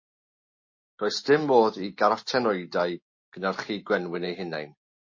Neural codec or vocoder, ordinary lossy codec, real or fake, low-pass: none; MP3, 32 kbps; real; 7.2 kHz